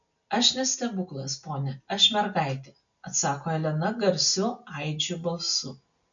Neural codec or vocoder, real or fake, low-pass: none; real; 7.2 kHz